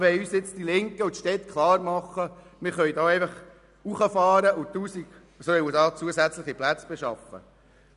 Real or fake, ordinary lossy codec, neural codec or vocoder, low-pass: real; none; none; 10.8 kHz